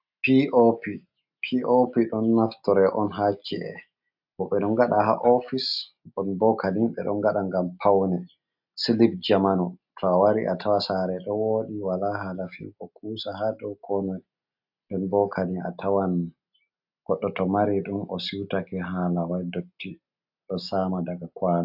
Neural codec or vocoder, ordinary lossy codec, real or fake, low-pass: none; AAC, 48 kbps; real; 5.4 kHz